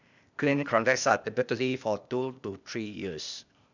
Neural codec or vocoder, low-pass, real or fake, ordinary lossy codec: codec, 16 kHz, 0.8 kbps, ZipCodec; 7.2 kHz; fake; none